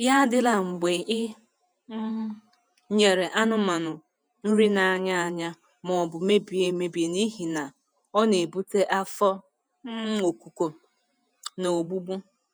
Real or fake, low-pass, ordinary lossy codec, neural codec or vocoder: fake; none; none; vocoder, 48 kHz, 128 mel bands, Vocos